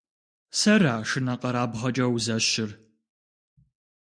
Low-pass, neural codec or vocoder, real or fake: 9.9 kHz; none; real